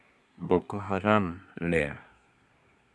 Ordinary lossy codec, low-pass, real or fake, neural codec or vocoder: none; none; fake; codec, 24 kHz, 1 kbps, SNAC